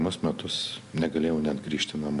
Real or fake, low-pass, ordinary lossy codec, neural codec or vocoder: real; 10.8 kHz; AAC, 64 kbps; none